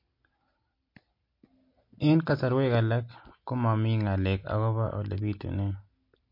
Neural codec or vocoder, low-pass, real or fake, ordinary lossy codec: none; 5.4 kHz; real; MP3, 32 kbps